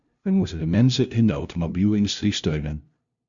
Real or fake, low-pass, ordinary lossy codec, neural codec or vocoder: fake; 7.2 kHz; Opus, 64 kbps; codec, 16 kHz, 0.5 kbps, FunCodec, trained on LibriTTS, 25 frames a second